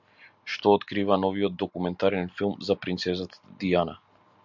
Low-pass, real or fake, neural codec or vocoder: 7.2 kHz; real; none